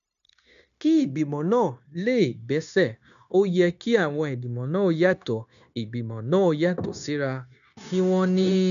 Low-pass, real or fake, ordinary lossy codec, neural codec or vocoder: 7.2 kHz; fake; none; codec, 16 kHz, 0.9 kbps, LongCat-Audio-Codec